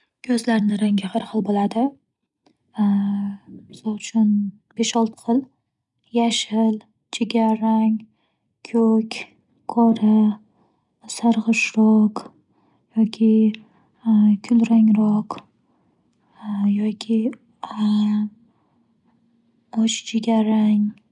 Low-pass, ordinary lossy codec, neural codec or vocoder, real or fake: 10.8 kHz; none; none; real